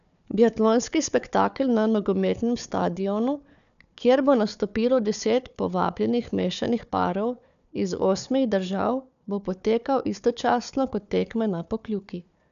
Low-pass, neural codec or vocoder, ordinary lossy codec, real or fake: 7.2 kHz; codec, 16 kHz, 4 kbps, FunCodec, trained on Chinese and English, 50 frames a second; none; fake